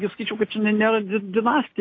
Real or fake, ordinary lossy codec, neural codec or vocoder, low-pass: real; AAC, 32 kbps; none; 7.2 kHz